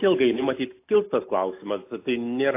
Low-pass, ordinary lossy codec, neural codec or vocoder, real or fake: 3.6 kHz; AAC, 24 kbps; none; real